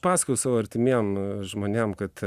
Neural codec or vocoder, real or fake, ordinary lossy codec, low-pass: none; real; Opus, 64 kbps; 14.4 kHz